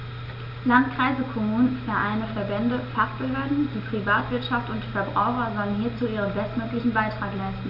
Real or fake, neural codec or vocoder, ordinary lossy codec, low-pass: real; none; none; 5.4 kHz